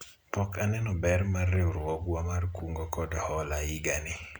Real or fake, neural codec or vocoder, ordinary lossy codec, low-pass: real; none; none; none